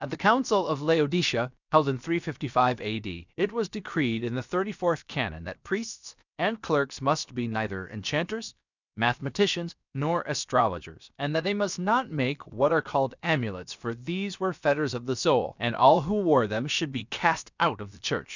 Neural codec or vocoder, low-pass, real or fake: codec, 16 kHz, about 1 kbps, DyCAST, with the encoder's durations; 7.2 kHz; fake